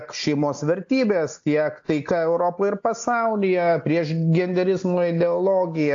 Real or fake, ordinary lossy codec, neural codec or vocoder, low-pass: real; AAC, 48 kbps; none; 7.2 kHz